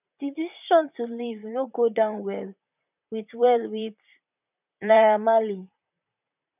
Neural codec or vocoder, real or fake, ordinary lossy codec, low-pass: vocoder, 44.1 kHz, 128 mel bands, Pupu-Vocoder; fake; none; 3.6 kHz